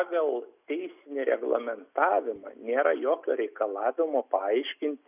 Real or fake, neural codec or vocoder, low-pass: real; none; 3.6 kHz